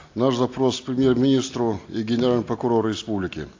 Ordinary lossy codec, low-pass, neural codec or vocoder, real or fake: AAC, 48 kbps; 7.2 kHz; none; real